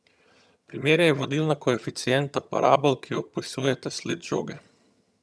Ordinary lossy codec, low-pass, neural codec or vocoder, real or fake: none; none; vocoder, 22.05 kHz, 80 mel bands, HiFi-GAN; fake